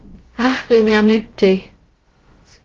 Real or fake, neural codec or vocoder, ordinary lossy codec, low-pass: fake; codec, 16 kHz, about 1 kbps, DyCAST, with the encoder's durations; Opus, 16 kbps; 7.2 kHz